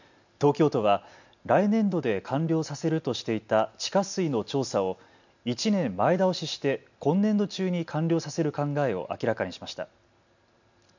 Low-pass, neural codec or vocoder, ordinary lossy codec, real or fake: 7.2 kHz; none; none; real